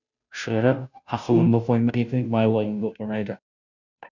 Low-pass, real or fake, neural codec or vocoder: 7.2 kHz; fake; codec, 16 kHz, 0.5 kbps, FunCodec, trained on Chinese and English, 25 frames a second